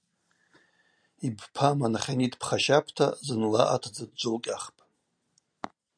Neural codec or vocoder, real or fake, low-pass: none; real; 9.9 kHz